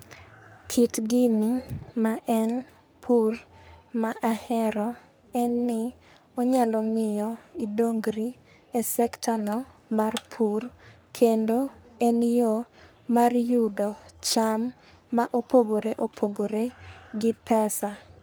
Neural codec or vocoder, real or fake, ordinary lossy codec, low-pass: codec, 44.1 kHz, 3.4 kbps, Pupu-Codec; fake; none; none